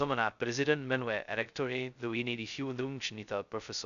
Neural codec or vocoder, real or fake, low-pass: codec, 16 kHz, 0.2 kbps, FocalCodec; fake; 7.2 kHz